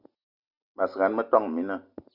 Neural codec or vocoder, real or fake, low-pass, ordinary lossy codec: none; real; 5.4 kHz; Opus, 64 kbps